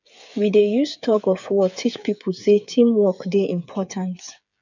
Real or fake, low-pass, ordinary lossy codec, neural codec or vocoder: fake; 7.2 kHz; none; codec, 16 kHz, 8 kbps, FreqCodec, smaller model